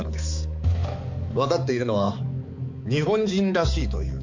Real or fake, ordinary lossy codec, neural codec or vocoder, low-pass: fake; MP3, 48 kbps; codec, 16 kHz, 4 kbps, X-Codec, HuBERT features, trained on balanced general audio; 7.2 kHz